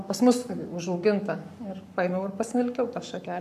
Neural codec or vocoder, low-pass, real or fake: codec, 44.1 kHz, 7.8 kbps, Pupu-Codec; 14.4 kHz; fake